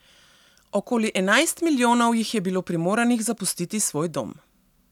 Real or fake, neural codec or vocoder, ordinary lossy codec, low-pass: real; none; none; 19.8 kHz